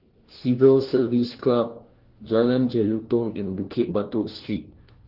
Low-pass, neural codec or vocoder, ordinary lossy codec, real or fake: 5.4 kHz; codec, 16 kHz, 1 kbps, FunCodec, trained on LibriTTS, 50 frames a second; Opus, 16 kbps; fake